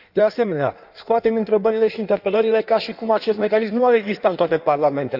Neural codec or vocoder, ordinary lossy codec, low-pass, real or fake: codec, 16 kHz in and 24 kHz out, 1.1 kbps, FireRedTTS-2 codec; none; 5.4 kHz; fake